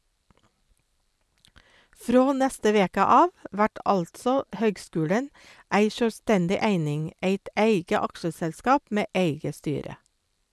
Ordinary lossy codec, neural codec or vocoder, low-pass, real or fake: none; none; none; real